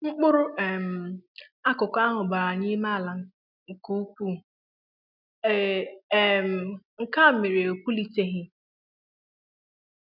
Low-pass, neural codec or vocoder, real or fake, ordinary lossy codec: 5.4 kHz; none; real; none